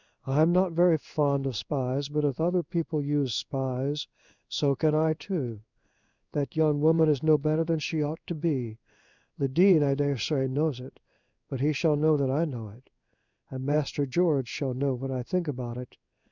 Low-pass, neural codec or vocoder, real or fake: 7.2 kHz; codec, 16 kHz in and 24 kHz out, 1 kbps, XY-Tokenizer; fake